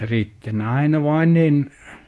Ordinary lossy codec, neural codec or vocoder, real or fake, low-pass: none; codec, 24 kHz, 0.9 kbps, WavTokenizer, medium speech release version 2; fake; none